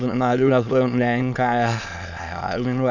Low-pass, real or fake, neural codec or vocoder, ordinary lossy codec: 7.2 kHz; fake; autoencoder, 22.05 kHz, a latent of 192 numbers a frame, VITS, trained on many speakers; none